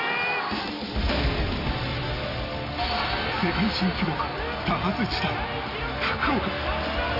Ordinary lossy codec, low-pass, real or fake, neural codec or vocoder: AAC, 32 kbps; 5.4 kHz; real; none